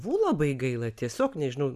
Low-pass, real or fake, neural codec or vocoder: 14.4 kHz; real; none